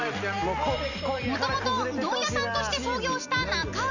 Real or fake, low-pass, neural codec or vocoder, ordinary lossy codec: real; 7.2 kHz; none; none